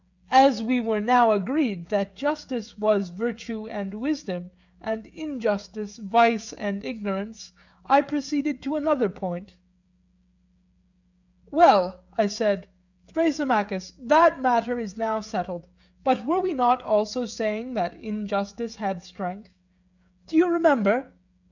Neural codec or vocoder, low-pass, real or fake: codec, 16 kHz, 16 kbps, FreqCodec, smaller model; 7.2 kHz; fake